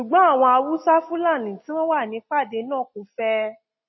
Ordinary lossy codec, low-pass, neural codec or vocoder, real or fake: MP3, 24 kbps; 7.2 kHz; none; real